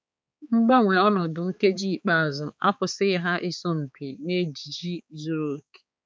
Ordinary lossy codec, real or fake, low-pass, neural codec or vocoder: none; fake; none; codec, 16 kHz, 4 kbps, X-Codec, HuBERT features, trained on balanced general audio